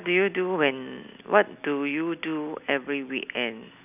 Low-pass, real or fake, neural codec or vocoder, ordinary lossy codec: 3.6 kHz; real; none; none